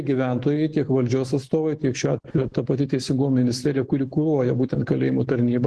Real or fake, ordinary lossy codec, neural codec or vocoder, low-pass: fake; Opus, 16 kbps; autoencoder, 48 kHz, 128 numbers a frame, DAC-VAE, trained on Japanese speech; 10.8 kHz